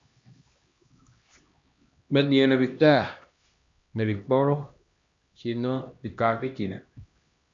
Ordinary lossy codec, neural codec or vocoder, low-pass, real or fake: Opus, 64 kbps; codec, 16 kHz, 1 kbps, X-Codec, HuBERT features, trained on LibriSpeech; 7.2 kHz; fake